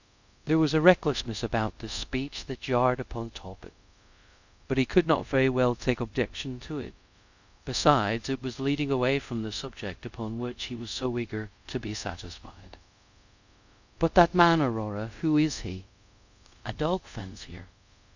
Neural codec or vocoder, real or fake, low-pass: codec, 24 kHz, 0.5 kbps, DualCodec; fake; 7.2 kHz